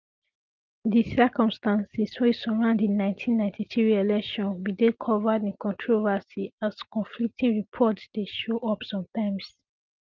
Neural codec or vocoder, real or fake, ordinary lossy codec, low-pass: none; real; Opus, 24 kbps; 7.2 kHz